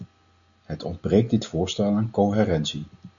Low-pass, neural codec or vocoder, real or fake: 7.2 kHz; none; real